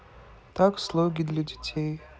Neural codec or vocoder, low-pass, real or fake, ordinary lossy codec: none; none; real; none